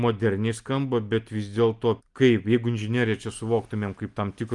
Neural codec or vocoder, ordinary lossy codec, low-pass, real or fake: none; Opus, 32 kbps; 10.8 kHz; real